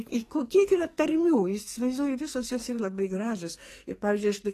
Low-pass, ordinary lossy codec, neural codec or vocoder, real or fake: 14.4 kHz; AAC, 48 kbps; codec, 44.1 kHz, 2.6 kbps, SNAC; fake